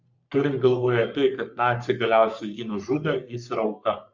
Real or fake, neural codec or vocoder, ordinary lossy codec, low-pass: fake; codec, 44.1 kHz, 3.4 kbps, Pupu-Codec; Opus, 64 kbps; 7.2 kHz